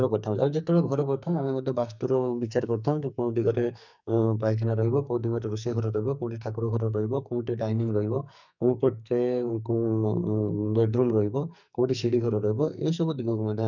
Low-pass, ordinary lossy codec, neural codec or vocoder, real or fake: 7.2 kHz; none; codec, 44.1 kHz, 2.6 kbps, SNAC; fake